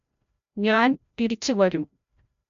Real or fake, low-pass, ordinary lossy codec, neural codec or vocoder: fake; 7.2 kHz; none; codec, 16 kHz, 0.5 kbps, FreqCodec, larger model